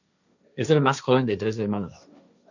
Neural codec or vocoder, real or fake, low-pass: codec, 16 kHz, 1.1 kbps, Voila-Tokenizer; fake; 7.2 kHz